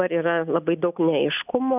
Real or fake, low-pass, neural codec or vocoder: real; 3.6 kHz; none